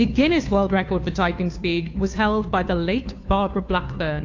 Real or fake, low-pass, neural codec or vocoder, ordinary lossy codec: fake; 7.2 kHz; codec, 16 kHz, 2 kbps, FunCodec, trained on Chinese and English, 25 frames a second; AAC, 48 kbps